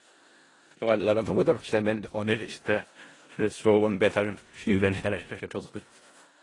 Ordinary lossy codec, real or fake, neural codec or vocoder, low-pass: AAC, 32 kbps; fake; codec, 16 kHz in and 24 kHz out, 0.4 kbps, LongCat-Audio-Codec, four codebook decoder; 10.8 kHz